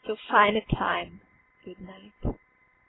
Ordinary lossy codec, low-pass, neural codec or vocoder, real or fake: AAC, 16 kbps; 7.2 kHz; none; real